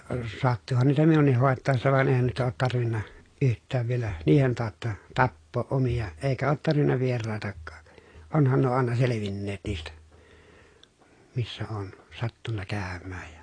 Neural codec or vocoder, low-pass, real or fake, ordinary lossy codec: none; 9.9 kHz; real; AAC, 32 kbps